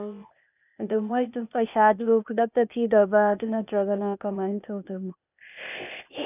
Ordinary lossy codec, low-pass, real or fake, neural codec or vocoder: none; 3.6 kHz; fake; codec, 16 kHz, 0.8 kbps, ZipCodec